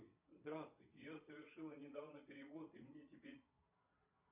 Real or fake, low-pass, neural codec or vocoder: fake; 3.6 kHz; vocoder, 22.05 kHz, 80 mel bands, Vocos